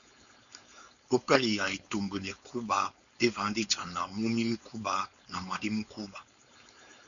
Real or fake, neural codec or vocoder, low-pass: fake; codec, 16 kHz, 4.8 kbps, FACodec; 7.2 kHz